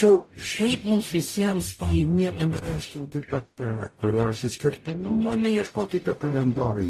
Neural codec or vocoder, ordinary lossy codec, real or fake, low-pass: codec, 44.1 kHz, 0.9 kbps, DAC; AAC, 48 kbps; fake; 14.4 kHz